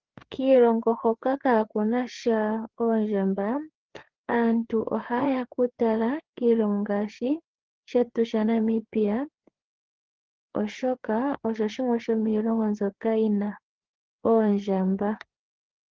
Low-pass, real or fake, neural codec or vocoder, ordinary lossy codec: 7.2 kHz; fake; codec, 16 kHz, 4 kbps, FreqCodec, larger model; Opus, 16 kbps